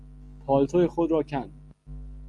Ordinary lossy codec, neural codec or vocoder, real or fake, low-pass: Opus, 32 kbps; none; real; 10.8 kHz